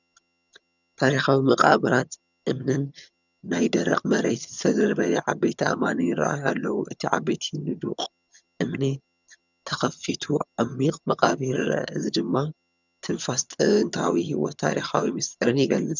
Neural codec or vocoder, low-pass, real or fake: vocoder, 22.05 kHz, 80 mel bands, HiFi-GAN; 7.2 kHz; fake